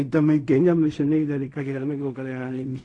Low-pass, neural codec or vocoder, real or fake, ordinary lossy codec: 10.8 kHz; codec, 16 kHz in and 24 kHz out, 0.4 kbps, LongCat-Audio-Codec, fine tuned four codebook decoder; fake; AAC, 48 kbps